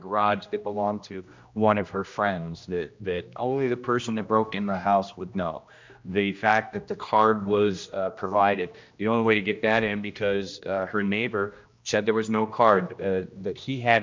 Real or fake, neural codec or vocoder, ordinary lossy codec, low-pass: fake; codec, 16 kHz, 1 kbps, X-Codec, HuBERT features, trained on general audio; MP3, 64 kbps; 7.2 kHz